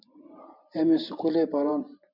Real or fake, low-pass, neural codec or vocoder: fake; 5.4 kHz; vocoder, 44.1 kHz, 128 mel bands every 512 samples, BigVGAN v2